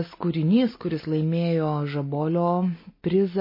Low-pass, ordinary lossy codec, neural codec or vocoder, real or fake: 5.4 kHz; MP3, 24 kbps; none; real